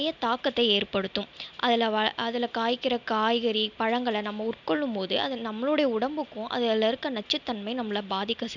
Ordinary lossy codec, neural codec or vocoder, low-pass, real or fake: none; none; 7.2 kHz; real